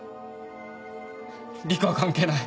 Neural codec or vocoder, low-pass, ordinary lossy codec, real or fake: none; none; none; real